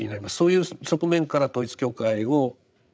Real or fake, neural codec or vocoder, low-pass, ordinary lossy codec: fake; codec, 16 kHz, 8 kbps, FreqCodec, larger model; none; none